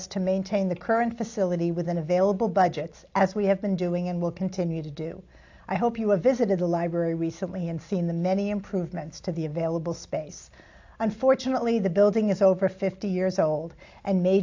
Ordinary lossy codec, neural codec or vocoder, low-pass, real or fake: AAC, 48 kbps; none; 7.2 kHz; real